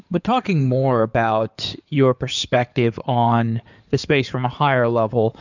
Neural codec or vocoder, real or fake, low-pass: codec, 16 kHz in and 24 kHz out, 2.2 kbps, FireRedTTS-2 codec; fake; 7.2 kHz